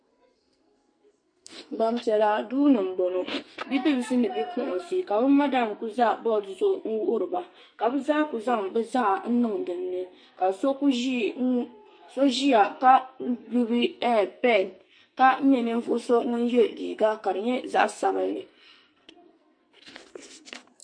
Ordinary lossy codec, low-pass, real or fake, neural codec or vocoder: MP3, 48 kbps; 9.9 kHz; fake; codec, 44.1 kHz, 2.6 kbps, SNAC